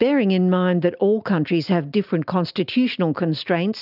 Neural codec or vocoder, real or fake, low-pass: none; real; 5.4 kHz